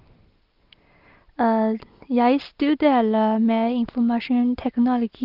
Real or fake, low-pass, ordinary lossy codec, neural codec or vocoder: real; 5.4 kHz; Opus, 24 kbps; none